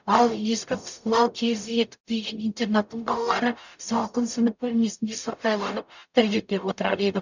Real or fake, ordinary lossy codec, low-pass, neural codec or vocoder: fake; none; 7.2 kHz; codec, 44.1 kHz, 0.9 kbps, DAC